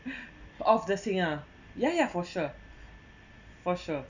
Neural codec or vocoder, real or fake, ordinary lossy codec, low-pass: none; real; none; 7.2 kHz